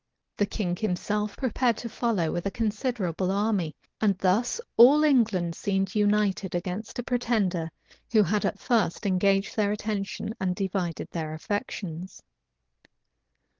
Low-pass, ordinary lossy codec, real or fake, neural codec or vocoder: 7.2 kHz; Opus, 16 kbps; real; none